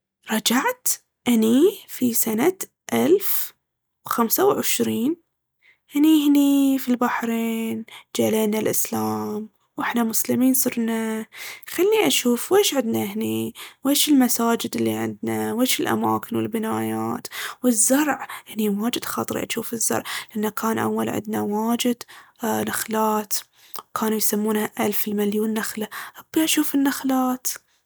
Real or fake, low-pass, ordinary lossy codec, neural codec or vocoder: real; none; none; none